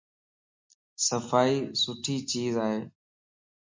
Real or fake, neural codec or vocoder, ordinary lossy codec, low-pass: real; none; MP3, 48 kbps; 7.2 kHz